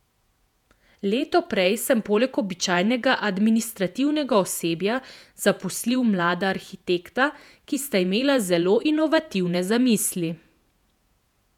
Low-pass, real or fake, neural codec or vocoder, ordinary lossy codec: 19.8 kHz; fake; vocoder, 48 kHz, 128 mel bands, Vocos; none